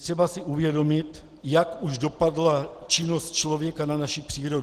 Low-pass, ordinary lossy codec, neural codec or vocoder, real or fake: 14.4 kHz; Opus, 16 kbps; none; real